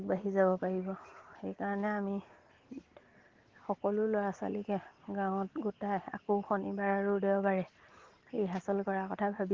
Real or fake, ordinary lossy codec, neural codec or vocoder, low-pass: real; Opus, 16 kbps; none; 7.2 kHz